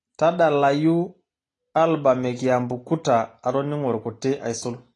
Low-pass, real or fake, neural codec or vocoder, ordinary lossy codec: 10.8 kHz; real; none; AAC, 32 kbps